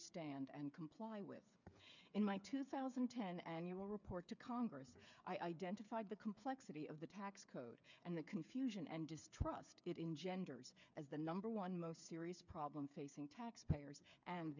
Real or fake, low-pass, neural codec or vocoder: fake; 7.2 kHz; codec, 16 kHz, 8 kbps, FreqCodec, smaller model